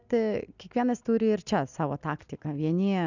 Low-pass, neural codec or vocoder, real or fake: 7.2 kHz; none; real